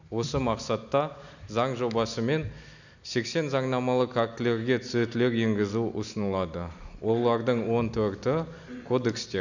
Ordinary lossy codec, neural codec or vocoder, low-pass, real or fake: none; none; 7.2 kHz; real